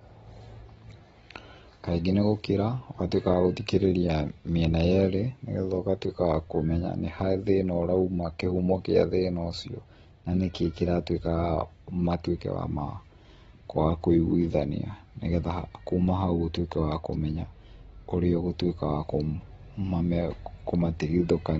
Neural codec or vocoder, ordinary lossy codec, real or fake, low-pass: none; AAC, 24 kbps; real; 19.8 kHz